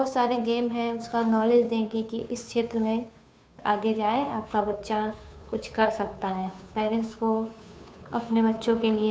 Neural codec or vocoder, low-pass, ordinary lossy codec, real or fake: codec, 16 kHz, 2 kbps, FunCodec, trained on Chinese and English, 25 frames a second; none; none; fake